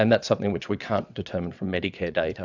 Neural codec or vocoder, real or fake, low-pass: vocoder, 22.05 kHz, 80 mel bands, WaveNeXt; fake; 7.2 kHz